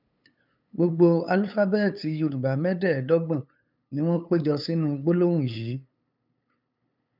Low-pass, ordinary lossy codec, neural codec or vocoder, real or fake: 5.4 kHz; none; codec, 16 kHz, 8 kbps, FunCodec, trained on LibriTTS, 25 frames a second; fake